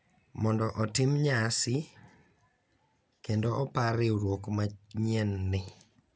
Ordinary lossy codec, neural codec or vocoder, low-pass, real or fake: none; none; none; real